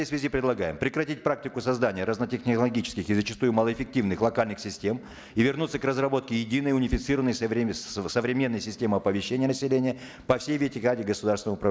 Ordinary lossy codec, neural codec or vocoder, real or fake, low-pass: none; none; real; none